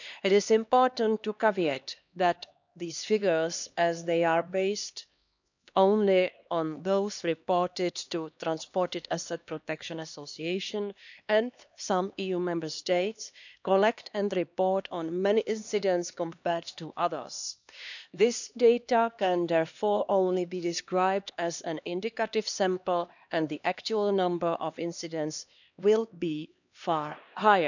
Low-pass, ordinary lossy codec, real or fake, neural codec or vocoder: 7.2 kHz; none; fake; codec, 16 kHz, 2 kbps, X-Codec, HuBERT features, trained on LibriSpeech